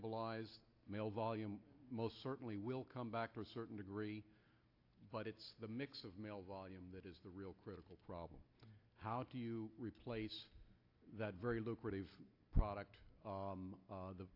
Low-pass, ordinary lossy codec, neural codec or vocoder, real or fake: 5.4 kHz; AAC, 48 kbps; none; real